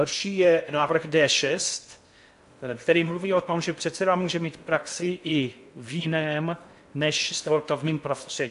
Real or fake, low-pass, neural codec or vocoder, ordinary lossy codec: fake; 10.8 kHz; codec, 16 kHz in and 24 kHz out, 0.6 kbps, FocalCodec, streaming, 4096 codes; AAC, 64 kbps